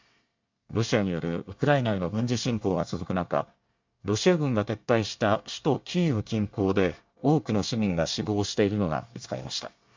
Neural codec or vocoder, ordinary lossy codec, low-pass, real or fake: codec, 24 kHz, 1 kbps, SNAC; MP3, 64 kbps; 7.2 kHz; fake